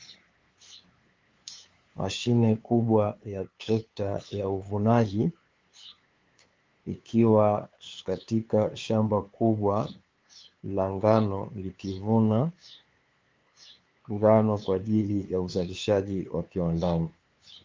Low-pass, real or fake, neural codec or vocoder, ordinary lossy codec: 7.2 kHz; fake; codec, 16 kHz, 2 kbps, FunCodec, trained on LibriTTS, 25 frames a second; Opus, 32 kbps